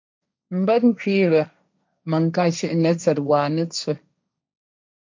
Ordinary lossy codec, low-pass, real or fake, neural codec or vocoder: MP3, 64 kbps; 7.2 kHz; fake; codec, 16 kHz, 1.1 kbps, Voila-Tokenizer